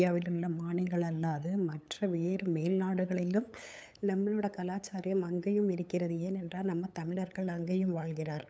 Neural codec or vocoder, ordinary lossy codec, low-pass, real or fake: codec, 16 kHz, 8 kbps, FunCodec, trained on LibriTTS, 25 frames a second; none; none; fake